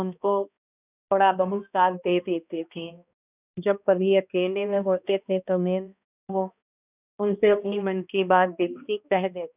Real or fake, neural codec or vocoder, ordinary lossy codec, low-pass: fake; codec, 16 kHz, 1 kbps, X-Codec, HuBERT features, trained on balanced general audio; none; 3.6 kHz